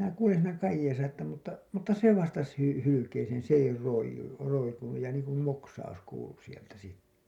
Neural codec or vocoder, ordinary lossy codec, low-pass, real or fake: none; none; 19.8 kHz; real